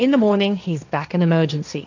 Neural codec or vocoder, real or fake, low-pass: codec, 16 kHz, 1.1 kbps, Voila-Tokenizer; fake; 7.2 kHz